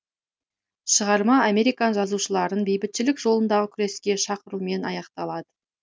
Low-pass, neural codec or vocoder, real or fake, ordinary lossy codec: none; none; real; none